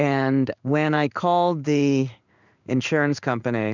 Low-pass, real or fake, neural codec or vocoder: 7.2 kHz; fake; codec, 16 kHz in and 24 kHz out, 1 kbps, XY-Tokenizer